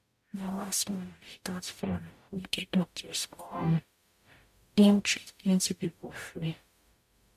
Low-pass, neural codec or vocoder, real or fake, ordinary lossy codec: 14.4 kHz; codec, 44.1 kHz, 0.9 kbps, DAC; fake; MP3, 96 kbps